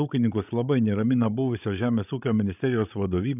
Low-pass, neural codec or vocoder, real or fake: 3.6 kHz; codec, 16 kHz, 16 kbps, FreqCodec, larger model; fake